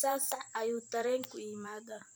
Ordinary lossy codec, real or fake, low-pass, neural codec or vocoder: none; fake; none; vocoder, 44.1 kHz, 128 mel bands every 512 samples, BigVGAN v2